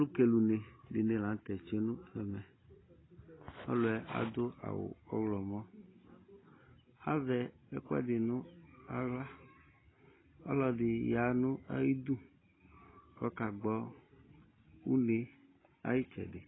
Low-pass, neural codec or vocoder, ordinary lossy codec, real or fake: 7.2 kHz; none; AAC, 16 kbps; real